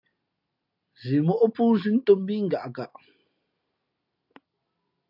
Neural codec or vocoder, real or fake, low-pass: none; real; 5.4 kHz